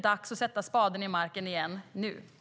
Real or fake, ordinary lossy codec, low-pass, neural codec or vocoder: real; none; none; none